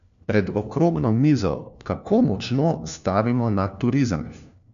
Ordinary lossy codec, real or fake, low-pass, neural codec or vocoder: none; fake; 7.2 kHz; codec, 16 kHz, 1 kbps, FunCodec, trained on LibriTTS, 50 frames a second